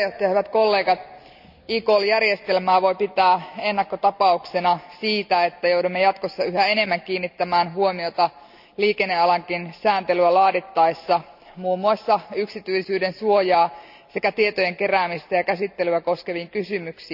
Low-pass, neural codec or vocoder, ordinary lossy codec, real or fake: 5.4 kHz; none; none; real